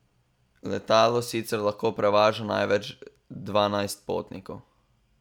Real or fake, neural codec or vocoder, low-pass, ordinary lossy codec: real; none; 19.8 kHz; none